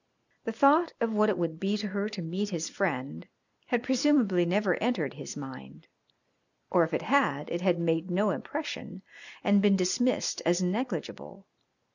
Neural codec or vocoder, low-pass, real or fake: none; 7.2 kHz; real